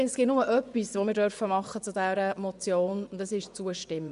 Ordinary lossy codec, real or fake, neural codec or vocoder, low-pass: none; fake; vocoder, 24 kHz, 100 mel bands, Vocos; 10.8 kHz